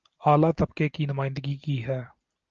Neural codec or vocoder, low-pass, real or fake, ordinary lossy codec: none; 7.2 kHz; real; Opus, 24 kbps